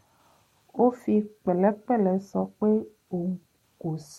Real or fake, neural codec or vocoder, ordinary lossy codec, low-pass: real; none; MP3, 64 kbps; 19.8 kHz